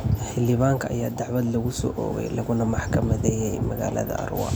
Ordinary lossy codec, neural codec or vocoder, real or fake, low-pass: none; none; real; none